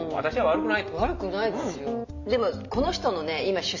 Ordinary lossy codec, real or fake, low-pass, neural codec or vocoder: none; real; 7.2 kHz; none